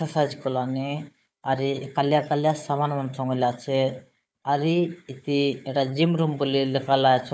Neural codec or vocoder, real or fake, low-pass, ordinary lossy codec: codec, 16 kHz, 4 kbps, FunCodec, trained on Chinese and English, 50 frames a second; fake; none; none